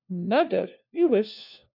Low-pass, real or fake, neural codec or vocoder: 5.4 kHz; fake; codec, 16 kHz, 1 kbps, FunCodec, trained on LibriTTS, 50 frames a second